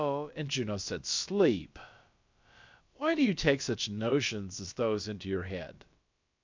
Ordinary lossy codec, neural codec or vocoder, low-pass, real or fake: MP3, 64 kbps; codec, 16 kHz, about 1 kbps, DyCAST, with the encoder's durations; 7.2 kHz; fake